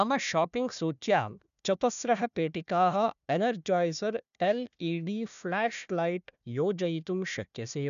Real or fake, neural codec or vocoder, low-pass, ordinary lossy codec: fake; codec, 16 kHz, 1 kbps, FunCodec, trained on Chinese and English, 50 frames a second; 7.2 kHz; none